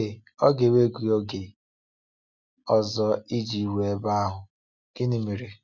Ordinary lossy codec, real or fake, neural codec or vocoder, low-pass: none; real; none; 7.2 kHz